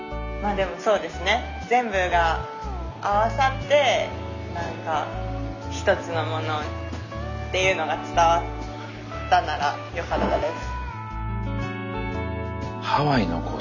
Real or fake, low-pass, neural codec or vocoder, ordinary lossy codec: real; 7.2 kHz; none; none